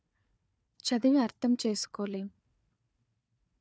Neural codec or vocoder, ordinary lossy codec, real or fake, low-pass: codec, 16 kHz, 4 kbps, FunCodec, trained on Chinese and English, 50 frames a second; none; fake; none